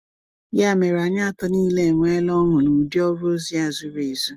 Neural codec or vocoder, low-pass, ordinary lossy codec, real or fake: none; 14.4 kHz; Opus, 24 kbps; real